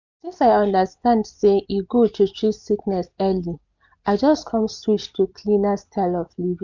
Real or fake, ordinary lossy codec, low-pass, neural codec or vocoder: real; none; 7.2 kHz; none